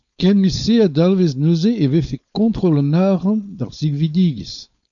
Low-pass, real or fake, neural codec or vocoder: 7.2 kHz; fake; codec, 16 kHz, 4.8 kbps, FACodec